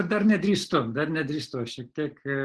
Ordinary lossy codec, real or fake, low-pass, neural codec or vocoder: Opus, 16 kbps; real; 10.8 kHz; none